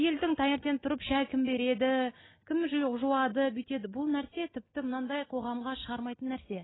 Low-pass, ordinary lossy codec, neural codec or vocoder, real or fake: 7.2 kHz; AAC, 16 kbps; none; real